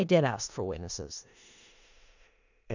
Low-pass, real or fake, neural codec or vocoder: 7.2 kHz; fake; codec, 16 kHz in and 24 kHz out, 0.4 kbps, LongCat-Audio-Codec, four codebook decoder